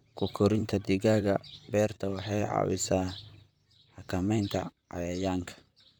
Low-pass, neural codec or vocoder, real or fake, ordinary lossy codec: none; vocoder, 44.1 kHz, 128 mel bands, Pupu-Vocoder; fake; none